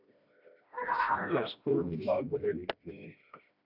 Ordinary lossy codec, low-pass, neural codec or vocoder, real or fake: AAC, 48 kbps; 5.4 kHz; codec, 16 kHz, 1 kbps, FreqCodec, smaller model; fake